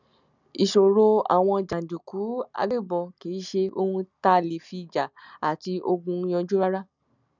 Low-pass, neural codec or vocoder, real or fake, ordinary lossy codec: 7.2 kHz; none; real; none